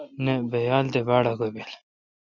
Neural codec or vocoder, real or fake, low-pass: none; real; 7.2 kHz